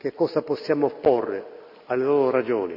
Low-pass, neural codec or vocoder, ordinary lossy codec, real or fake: 5.4 kHz; none; none; real